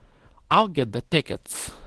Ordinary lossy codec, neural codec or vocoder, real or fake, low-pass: Opus, 16 kbps; codec, 24 kHz, 0.9 kbps, WavTokenizer, small release; fake; 10.8 kHz